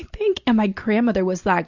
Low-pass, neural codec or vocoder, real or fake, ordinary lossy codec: 7.2 kHz; none; real; Opus, 64 kbps